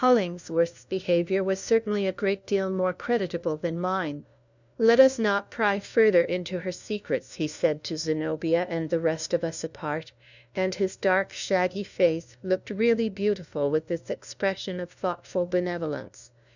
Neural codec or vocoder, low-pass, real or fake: codec, 16 kHz, 1 kbps, FunCodec, trained on LibriTTS, 50 frames a second; 7.2 kHz; fake